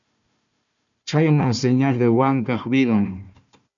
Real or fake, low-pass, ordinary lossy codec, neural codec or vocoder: fake; 7.2 kHz; MP3, 96 kbps; codec, 16 kHz, 1 kbps, FunCodec, trained on Chinese and English, 50 frames a second